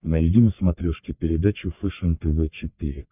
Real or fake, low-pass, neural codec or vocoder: fake; 3.6 kHz; codec, 44.1 kHz, 3.4 kbps, Pupu-Codec